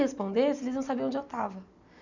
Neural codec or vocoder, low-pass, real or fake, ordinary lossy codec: none; 7.2 kHz; real; none